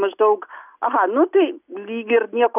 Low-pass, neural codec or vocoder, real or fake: 3.6 kHz; none; real